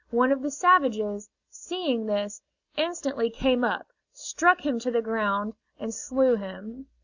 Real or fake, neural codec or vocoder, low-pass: real; none; 7.2 kHz